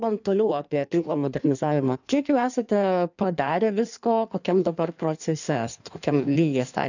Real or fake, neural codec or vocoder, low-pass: fake; codec, 16 kHz in and 24 kHz out, 1.1 kbps, FireRedTTS-2 codec; 7.2 kHz